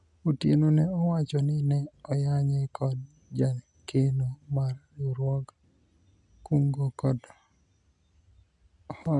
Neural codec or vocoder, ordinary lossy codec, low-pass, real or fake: none; none; 10.8 kHz; real